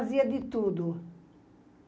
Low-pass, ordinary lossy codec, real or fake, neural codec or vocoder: none; none; real; none